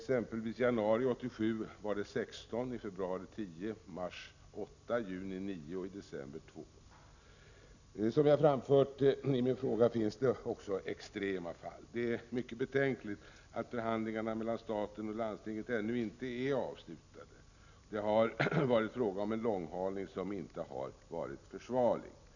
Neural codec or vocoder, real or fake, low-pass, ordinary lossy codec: none; real; 7.2 kHz; none